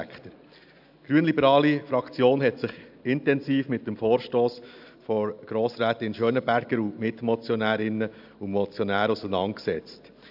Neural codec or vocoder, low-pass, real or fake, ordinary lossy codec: none; 5.4 kHz; real; AAC, 48 kbps